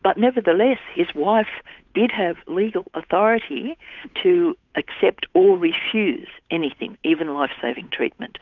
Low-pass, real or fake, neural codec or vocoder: 7.2 kHz; fake; codec, 16 kHz, 8 kbps, FunCodec, trained on Chinese and English, 25 frames a second